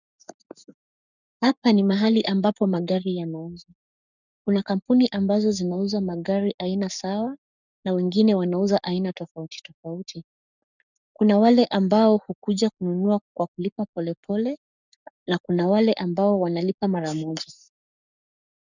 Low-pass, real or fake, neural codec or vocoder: 7.2 kHz; fake; codec, 44.1 kHz, 7.8 kbps, Pupu-Codec